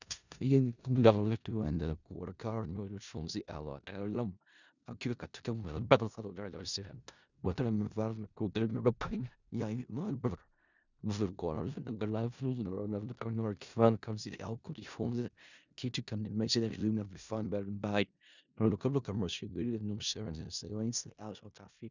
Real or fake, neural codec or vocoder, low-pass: fake; codec, 16 kHz in and 24 kHz out, 0.4 kbps, LongCat-Audio-Codec, four codebook decoder; 7.2 kHz